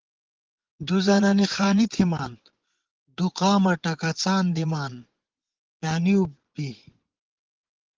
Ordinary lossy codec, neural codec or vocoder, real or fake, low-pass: Opus, 16 kbps; vocoder, 24 kHz, 100 mel bands, Vocos; fake; 7.2 kHz